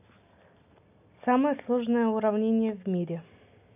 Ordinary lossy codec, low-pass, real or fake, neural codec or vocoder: none; 3.6 kHz; real; none